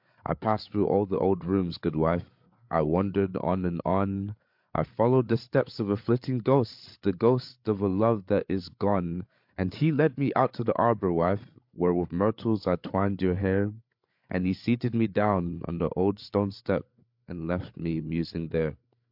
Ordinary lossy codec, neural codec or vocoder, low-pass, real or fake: MP3, 48 kbps; codec, 16 kHz, 8 kbps, FreqCodec, larger model; 5.4 kHz; fake